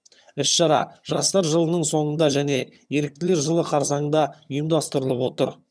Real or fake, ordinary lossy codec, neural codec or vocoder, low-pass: fake; none; vocoder, 22.05 kHz, 80 mel bands, HiFi-GAN; none